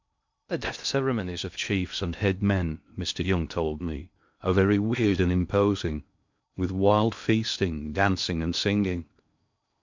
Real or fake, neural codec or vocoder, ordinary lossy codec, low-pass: fake; codec, 16 kHz in and 24 kHz out, 0.6 kbps, FocalCodec, streaming, 2048 codes; MP3, 64 kbps; 7.2 kHz